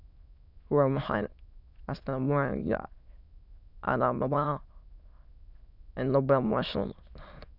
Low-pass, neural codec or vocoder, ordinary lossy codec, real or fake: 5.4 kHz; autoencoder, 22.05 kHz, a latent of 192 numbers a frame, VITS, trained on many speakers; none; fake